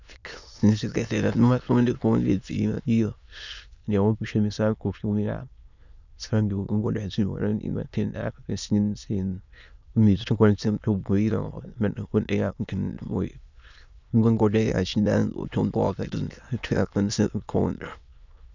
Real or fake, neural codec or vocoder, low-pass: fake; autoencoder, 22.05 kHz, a latent of 192 numbers a frame, VITS, trained on many speakers; 7.2 kHz